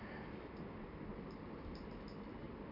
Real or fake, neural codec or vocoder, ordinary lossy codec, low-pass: real; none; none; 5.4 kHz